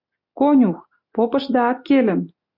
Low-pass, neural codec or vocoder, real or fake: 5.4 kHz; none; real